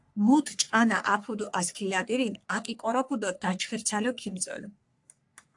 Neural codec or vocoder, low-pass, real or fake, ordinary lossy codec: codec, 44.1 kHz, 3.4 kbps, Pupu-Codec; 10.8 kHz; fake; AAC, 64 kbps